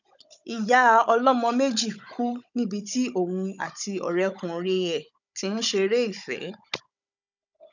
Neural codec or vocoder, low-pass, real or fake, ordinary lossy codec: codec, 16 kHz, 16 kbps, FunCodec, trained on Chinese and English, 50 frames a second; 7.2 kHz; fake; none